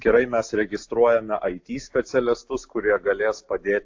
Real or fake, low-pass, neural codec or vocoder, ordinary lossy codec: real; 7.2 kHz; none; AAC, 48 kbps